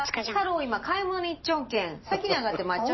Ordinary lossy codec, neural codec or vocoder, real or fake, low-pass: MP3, 24 kbps; none; real; 7.2 kHz